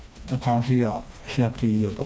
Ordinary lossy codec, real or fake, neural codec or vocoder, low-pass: none; fake; codec, 16 kHz, 2 kbps, FreqCodec, smaller model; none